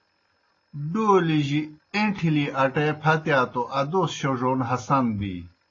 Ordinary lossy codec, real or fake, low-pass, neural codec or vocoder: AAC, 32 kbps; real; 7.2 kHz; none